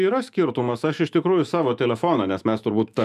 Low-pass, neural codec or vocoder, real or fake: 14.4 kHz; autoencoder, 48 kHz, 128 numbers a frame, DAC-VAE, trained on Japanese speech; fake